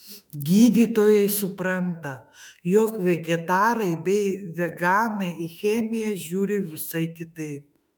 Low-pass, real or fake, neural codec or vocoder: 19.8 kHz; fake; autoencoder, 48 kHz, 32 numbers a frame, DAC-VAE, trained on Japanese speech